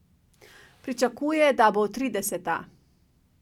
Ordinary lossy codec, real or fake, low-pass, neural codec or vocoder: none; real; 19.8 kHz; none